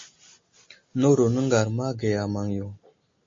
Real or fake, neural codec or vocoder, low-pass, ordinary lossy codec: real; none; 7.2 kHz; MP3, 32 kbps